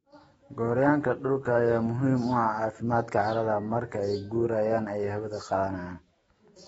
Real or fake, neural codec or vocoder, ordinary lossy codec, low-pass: fake; autoencoder, 48 kHz, 128 numbers a frame, DAC-VAE, trained on Japanese speech; AAC, 24 kbps; 19.8 kHz